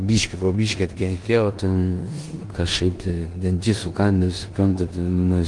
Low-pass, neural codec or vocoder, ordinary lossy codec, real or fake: 10.8 kHz; codec, 16 kHz in and 24 kHz out, 0.9 kbps, LongCat-Audio-Codec, four codebook decoder; Opus, 24 kbps; fake